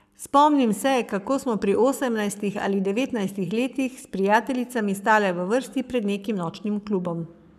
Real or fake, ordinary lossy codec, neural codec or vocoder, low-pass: fake; none; codec, 44.1 kHz, 7.8 kbps, Pupu-Codec; 14.4 kHz